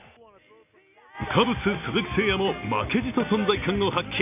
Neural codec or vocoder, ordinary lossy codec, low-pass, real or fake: none; none; 3.6 kHz; real